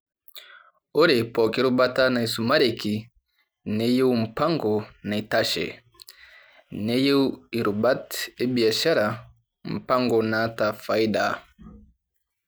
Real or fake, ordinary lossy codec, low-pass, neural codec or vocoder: real; none; none; none